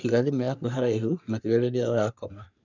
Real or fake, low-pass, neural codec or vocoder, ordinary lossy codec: fake; 7.2 kHz; codec, 44.1 kHz, 3.4 kbps, Pupu-Codec; none